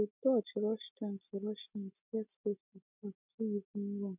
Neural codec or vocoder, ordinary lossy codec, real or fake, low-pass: none; none; real; 3.6 kHz